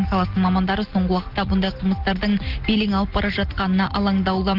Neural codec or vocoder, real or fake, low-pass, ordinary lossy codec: none; real; 5.4 kHz; Opus, 16 kbps